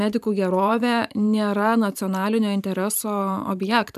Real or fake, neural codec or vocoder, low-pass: fake; vocoder, 44.1 kHz, 128 mel bands every 512 samples, BigVGAN v2; 14.4 kHz